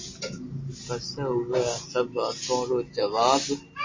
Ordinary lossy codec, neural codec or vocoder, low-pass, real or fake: MP3, 32 kbps; none; 7.2 kHz; real